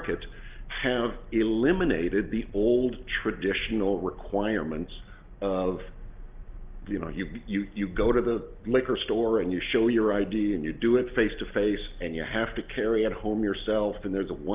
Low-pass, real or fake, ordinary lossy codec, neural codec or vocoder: 3.6 kHz; real; Opus, 32 kbps; none